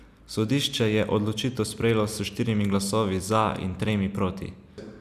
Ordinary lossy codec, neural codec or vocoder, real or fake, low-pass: none; none; real; 14.4 kHz